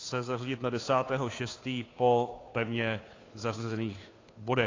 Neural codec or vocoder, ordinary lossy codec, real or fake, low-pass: codec, 16 kHz, 2 kbps, FunCodec, trained on Chinese and English, 25 frames a second; AAC, 32 kbps; fake; 7.2 kHz